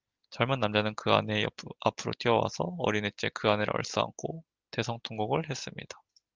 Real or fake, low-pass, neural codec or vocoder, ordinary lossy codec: real; 7.2 kHz; none; Opus, 24 kbps